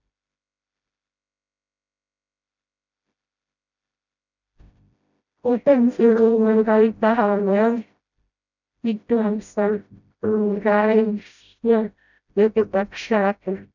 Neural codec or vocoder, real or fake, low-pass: codec, 16 kHz, 0.5 kbps, FreqCodec, smaller model; fake; 7.2 kHz